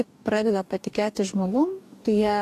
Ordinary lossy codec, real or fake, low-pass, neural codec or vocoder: AAC, 64 kbps; fake; 14.4 kHz; codec, 44.1 kHz, 2.6 kbps, DAC